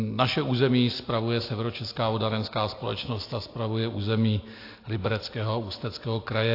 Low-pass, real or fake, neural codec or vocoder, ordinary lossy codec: 5.4 kHz; real; none; AAC, 32 kbps